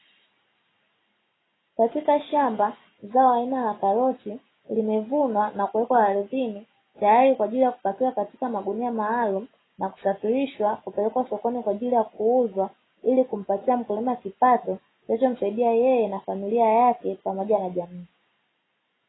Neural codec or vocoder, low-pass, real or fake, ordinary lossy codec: none; 7.2 kHz; real; AAC, 16 kbps